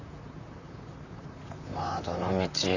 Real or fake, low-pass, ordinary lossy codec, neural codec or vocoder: fake; 7.2 kHz; none; vocoder, 44.1 kHz, 128 mel bands, Pupu-Vocoder